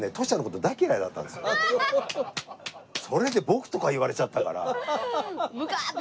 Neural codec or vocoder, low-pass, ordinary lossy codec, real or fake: none; none; none; real